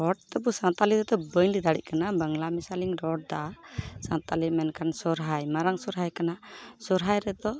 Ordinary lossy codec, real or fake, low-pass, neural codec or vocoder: none; real; none; none